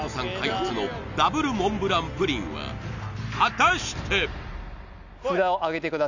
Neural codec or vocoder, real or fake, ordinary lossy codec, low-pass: none; real; none; 7.2 kHz